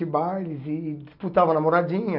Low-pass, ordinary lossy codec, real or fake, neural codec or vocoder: 5.4 kHz; none; real; none